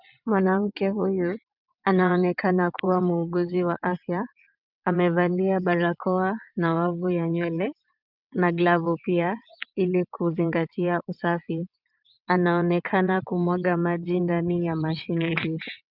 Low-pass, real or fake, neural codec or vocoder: 5.4 kHz; fake; vocoder, 22.05 kHz, 80 mel bands, WaveNeXt